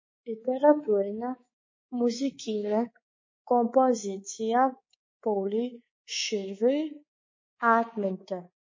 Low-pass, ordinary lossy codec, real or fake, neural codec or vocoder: 7.2 kHz; MP3, 32 kbps; fake; codec, 24 kHz, 3.1 kbps, DualCodec